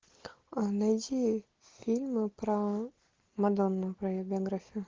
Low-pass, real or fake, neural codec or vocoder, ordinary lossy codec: 7.2 kHz; real; none; Opus, 16 kbps